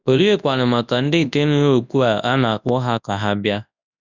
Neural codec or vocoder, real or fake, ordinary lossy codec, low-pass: codec, 24 kHz, 0.9 kbps, WavTokenizer, large speech release; fake; none; 7.2 kHz